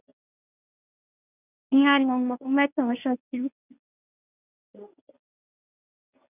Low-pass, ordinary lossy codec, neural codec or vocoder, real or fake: 3.6 kHz; none; codec, 24 kHz, 0.9 kbps, WavTokenizer, medium speech release version 1; fake